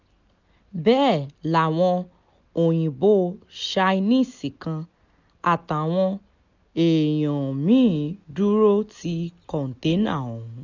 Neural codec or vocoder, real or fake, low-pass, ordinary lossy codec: none; real; 7.2 kHz; none